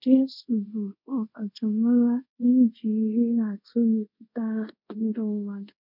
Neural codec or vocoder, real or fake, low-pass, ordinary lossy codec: codec, 24 kHz, 0.5 kbps, DualCodec; fake; 5.4 kHz; none